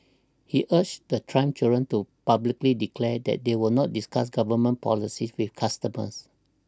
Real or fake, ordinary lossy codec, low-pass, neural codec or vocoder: real; none; none; none